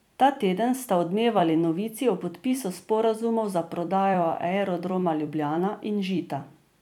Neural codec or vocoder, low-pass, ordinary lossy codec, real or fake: vocoder, 44.1 kHz, 128 mel bands every 256 samples, BigVGAN v2; 19.8 kHz; none; fake